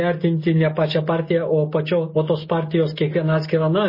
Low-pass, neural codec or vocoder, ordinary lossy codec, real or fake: 5.4 kHz; none; MP3, 24 kbps; real